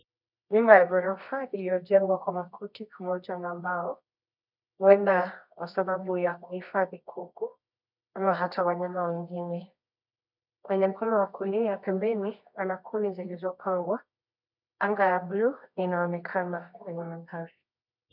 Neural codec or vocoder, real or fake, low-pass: codec, 24 kHz, 0.9 kbps, WavTokenizer, medium music audio release; fake; 5.4 kHz